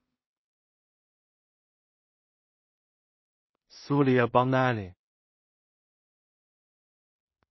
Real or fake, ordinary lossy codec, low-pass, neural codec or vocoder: fake; MP3, 24 kbps; 7.2 kHz; codec, 16 kHz in and 24 kHz out, 0.4 kbps, LongCat-Audio-Codec, two codebook decoder